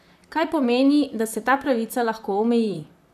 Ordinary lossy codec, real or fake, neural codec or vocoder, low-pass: none; fake; vocoder, 44.1 kHz, 128 mel bands, Pupu-Vocoder; 14.4 kHz